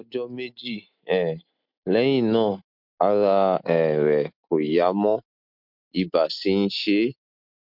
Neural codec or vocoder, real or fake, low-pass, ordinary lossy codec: none; real; 5.4 kHz; MP3, 48 kbps